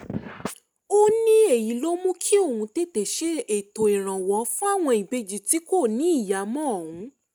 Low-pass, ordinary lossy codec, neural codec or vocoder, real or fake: none; none; none; real